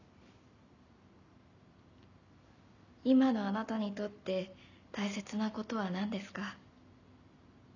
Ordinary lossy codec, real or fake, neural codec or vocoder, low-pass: none; real; none; 7.2 kHz